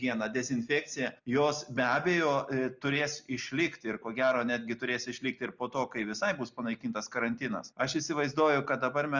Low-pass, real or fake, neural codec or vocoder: 7.2 kHz; real; none